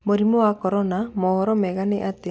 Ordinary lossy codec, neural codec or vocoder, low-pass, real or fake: none; none; none; real